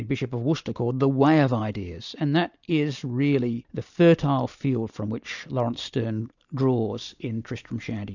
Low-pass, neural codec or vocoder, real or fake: 7.2 kHz; none; real